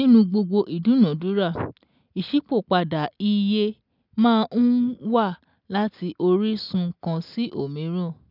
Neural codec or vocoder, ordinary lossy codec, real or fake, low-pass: none; none; real; 5.4 kHz